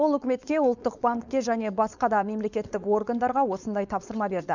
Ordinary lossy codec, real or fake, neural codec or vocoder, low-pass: none; fake; codec, 16 kHz, 4.8 kbps, FACodec; 7.2 kHz